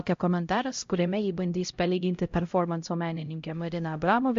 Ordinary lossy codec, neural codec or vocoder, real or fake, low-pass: MP3, 48 kbps; codec, 16 kHz, 0.5 kbps, X-Codec, HuBERT features, trained on LibriSpeech; fake; 7.2 kHz